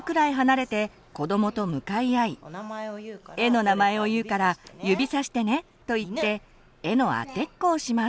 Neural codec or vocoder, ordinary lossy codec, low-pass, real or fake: none; none; none; real